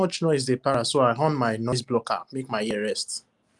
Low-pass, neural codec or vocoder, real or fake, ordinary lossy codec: 10.8 kHz; none; real; Opus, 32 kbps